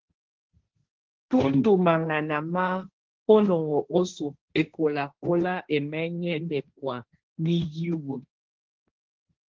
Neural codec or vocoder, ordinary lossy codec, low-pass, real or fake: codec, 16 kHz, 1.1 kbps, Voila-Tokenizer; Opus, 16 kbps; 7.2 kHz; fake